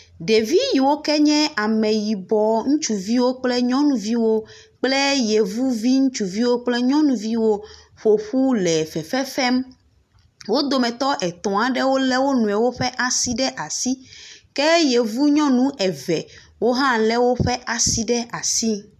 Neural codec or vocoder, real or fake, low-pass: none; real; 14.4 kHz